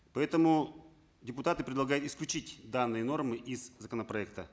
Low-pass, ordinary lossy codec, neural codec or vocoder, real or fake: none; none; none; real